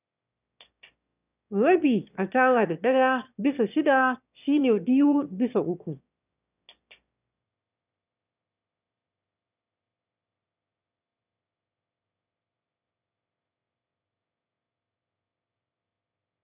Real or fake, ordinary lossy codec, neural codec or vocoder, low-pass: fake; none; autoencoder, 22.05 kHz, a latent of 192 numbers a frame, VITS, trained on one speaker; 3.6 kHz